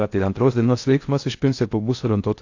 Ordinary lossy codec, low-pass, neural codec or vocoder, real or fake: AAC, 48 kbps; 7.2 kHz; codec, 16 kHz in and 24 kHz out, 0.6 kbps, FocalCodec, streaming, 4096 codes; fake